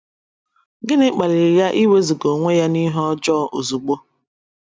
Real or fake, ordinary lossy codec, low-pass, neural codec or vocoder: real; none; none; none